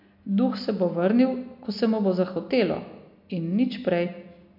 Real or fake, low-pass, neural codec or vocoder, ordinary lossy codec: real; 5.4 kHz; none; MP3, 48 kbps